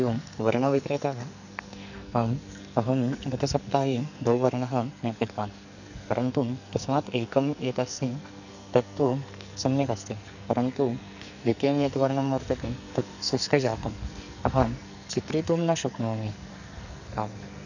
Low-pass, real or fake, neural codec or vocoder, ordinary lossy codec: 7.2 kHz; fake; codec, 44.1 kHz, 2.6 kbps, SNAC; none